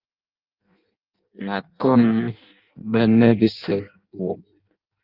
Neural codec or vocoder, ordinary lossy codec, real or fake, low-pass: codec, 16 kHz in and 24 kHz out, 0.6 kbps, FireRedTTS-2 codec; Opus, 24 kbps; fake; 5.4 kHz